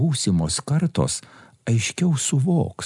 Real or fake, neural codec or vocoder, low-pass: real; none; 10.8 kHz